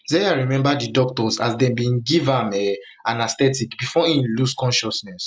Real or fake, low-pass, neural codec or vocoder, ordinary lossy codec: real; none; none; none